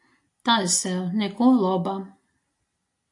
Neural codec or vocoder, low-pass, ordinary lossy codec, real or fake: none; 10.8 kHz; AAC, 64 kbps; real